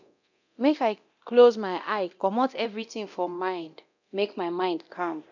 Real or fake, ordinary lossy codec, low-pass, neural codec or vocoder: fake; none; 7.2 kHz; codec, 24 kHz, 0.9 kbps, DualCodec